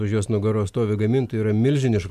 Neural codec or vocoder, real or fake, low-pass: none; real; 14.4 kHz